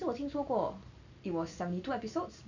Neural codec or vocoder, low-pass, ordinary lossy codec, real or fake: none; 7.2 kHz; none; real